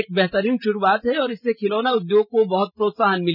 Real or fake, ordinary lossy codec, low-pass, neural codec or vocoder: real; none; 5.4 kHz; none